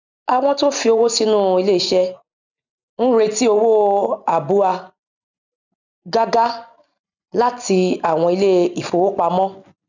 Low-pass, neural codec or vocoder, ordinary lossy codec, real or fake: 7.2 kHz; none; none; real